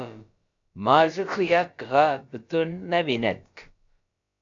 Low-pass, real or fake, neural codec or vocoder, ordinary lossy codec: 7.2 kHz; fake; codec, 16 kHz, about 1 kbps, DyCAST, with the encoder's durations; AAC, 64 kbps